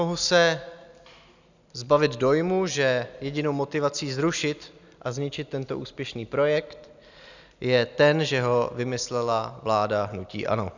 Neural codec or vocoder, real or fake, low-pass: none; real; 7.2 kHz